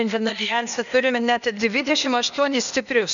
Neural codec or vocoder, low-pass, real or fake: codec, 16 kHz, 0.8 kbps, ZipCodec; 7.2 kHz; fake